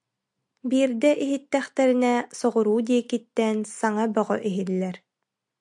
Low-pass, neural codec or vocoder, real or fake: 10.8 kHz; none; real